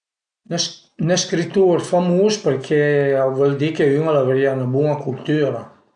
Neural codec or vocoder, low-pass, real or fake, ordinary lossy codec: none; 9.9 kHz; real; none